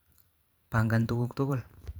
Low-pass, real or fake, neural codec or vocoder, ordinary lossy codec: none; fake; vocoder, 44.1 kHz, 128 mel bands every 512 samples, BigVGAN v2; none